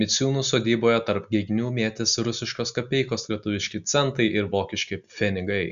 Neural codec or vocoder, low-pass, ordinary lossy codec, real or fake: none; 7.2 kHz; AAC, 96 kbps; real